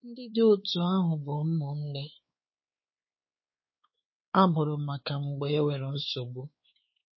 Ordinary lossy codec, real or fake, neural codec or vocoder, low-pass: MP3, 24 kbps; fake; codec, 16 kHz, 4 kbps, X-Codec, WavLM features, trained on Multilingual LibriSpeech; 7.2 kHz